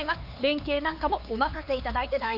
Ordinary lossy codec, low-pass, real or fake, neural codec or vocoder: none; 5.4 kHz; fake; codec, 16 kHz, 4 kbps, X-Codec, HuBERT features, trained on LibriSpeech